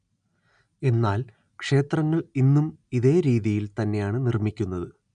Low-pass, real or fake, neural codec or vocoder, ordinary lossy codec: 9.9 kHz; real; none; none